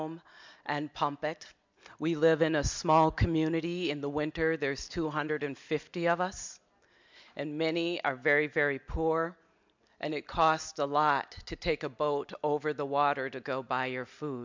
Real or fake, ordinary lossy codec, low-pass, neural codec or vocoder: real; MP3, 64 kbps; 7.2 kHz; none